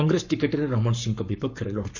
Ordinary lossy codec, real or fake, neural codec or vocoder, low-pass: none; fake; codec, 44.1 kHz, 7.8 kbps, Pupu-Codec; 7.2 kHz